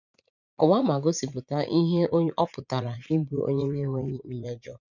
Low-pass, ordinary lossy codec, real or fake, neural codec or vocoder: 7.2 kHz; none; fake; vocoder, 44.1 kHz, 80 mel bands, Vocos